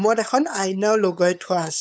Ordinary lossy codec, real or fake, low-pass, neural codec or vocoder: none; fake; none; codec, 16 kHz, 8 kbps, FunCodec, trained on LibriTTS, 25 frames a second